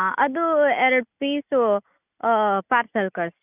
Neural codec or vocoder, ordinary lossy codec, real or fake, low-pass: none; none; real; 3.6 kHz